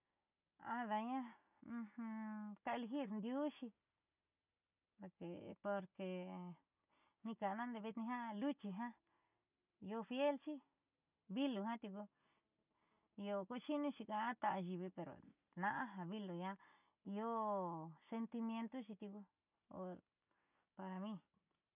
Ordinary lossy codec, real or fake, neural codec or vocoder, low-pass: none; real; none; 3.6 kHz